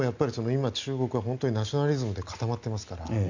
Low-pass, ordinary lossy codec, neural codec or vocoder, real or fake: 7.2 kHz; none; none; real